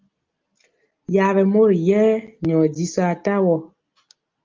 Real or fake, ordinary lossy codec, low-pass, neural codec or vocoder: real; Opus, 32 kbps; 7.2 kHz; none